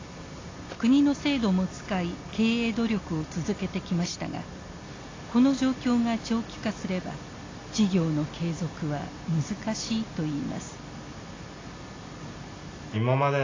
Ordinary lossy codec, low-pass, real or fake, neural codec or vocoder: AAC, 32 kbps; 7.2 kHz; real; none